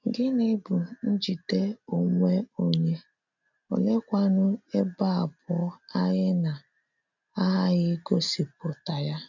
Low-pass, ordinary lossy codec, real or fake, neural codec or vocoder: 7.2 kHz; none; real; none